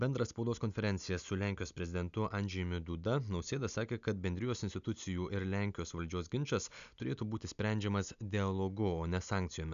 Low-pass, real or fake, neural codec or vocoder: 7.2 kHz; real; none